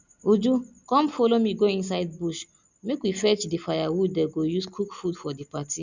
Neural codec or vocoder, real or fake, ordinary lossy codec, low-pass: none; real; none; 7.2 kHz